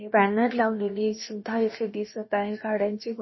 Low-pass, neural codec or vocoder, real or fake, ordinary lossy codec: 7.2 kHz; codec, 16 kHz, about 1 kbps, DyCAST, with the encoder's durations; fake; MP3, 24 kbps